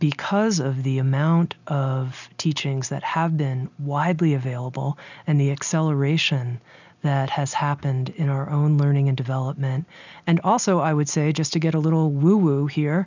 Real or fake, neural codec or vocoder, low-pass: real; none; 7.2 kHz